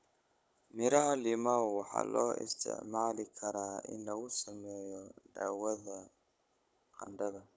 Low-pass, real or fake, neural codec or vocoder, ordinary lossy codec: none; fake; codec, 16 kHz, 16 kbps, FunCodec, trained on Chinese and English, 50 frames a second; none